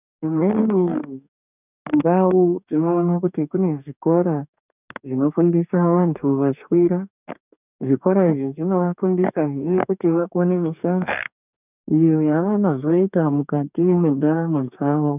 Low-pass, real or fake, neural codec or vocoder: 3.6 kHz; fake; codec, 44.1 kHz, 2.6 kbps, DAC